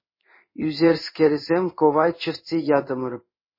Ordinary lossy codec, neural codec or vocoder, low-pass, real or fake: MP3, 24 kbps; codec, 16 kHz in and 24 kHz out, 1 kbps, XY-Tokenizer; 5.4 kHz; fake